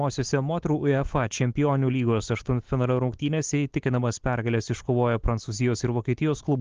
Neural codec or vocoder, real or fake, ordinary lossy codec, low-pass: none; real; Opus, 16 kbps; 7.2 kHz